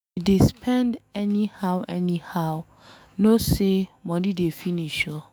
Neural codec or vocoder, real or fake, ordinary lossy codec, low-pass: autoencoder, 48 kHz, 128 numbers a frame, DAC-VAE, trained on Japanese speech; fake; none; none